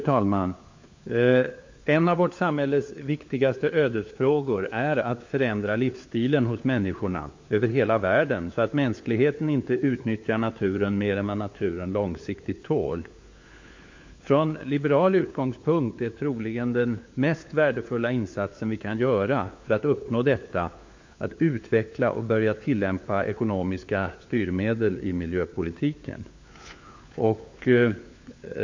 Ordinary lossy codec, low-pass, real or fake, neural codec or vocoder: MP3, 48 kbps; 7.2 kHz; fake; codec, 16 kHz, 4 kbps, FunCodec, trained on LibriTTS, 50 frames a second